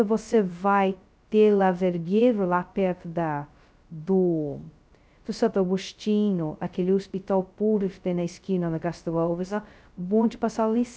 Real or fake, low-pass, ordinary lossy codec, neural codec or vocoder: fake; none; none; codec, 16 kHz, 0.2 kbps, FocalCodec